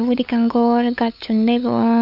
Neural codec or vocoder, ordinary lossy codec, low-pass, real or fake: codec, 16 kHz, 8 kbps, FunCodec, trained on LibriTTS, 25 frames a second; none; 5.4 kHz; fake